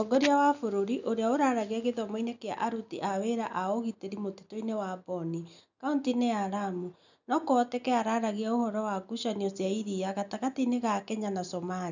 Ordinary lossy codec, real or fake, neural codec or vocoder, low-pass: none; real; none; 7.2 kHz